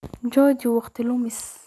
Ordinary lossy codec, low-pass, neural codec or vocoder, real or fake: none; none; none; real